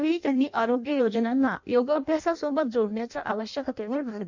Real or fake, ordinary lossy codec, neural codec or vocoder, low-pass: fake; none; codec, 16 kHz in and 24 kHz out, 0.6 kbps, FireRedTTS-2 codec; 7.2 kHz